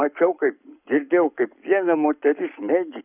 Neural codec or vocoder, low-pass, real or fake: codec, 44.1 kHz, 7.8 kbps, Pupu-Codec; 3.6 kHz; fake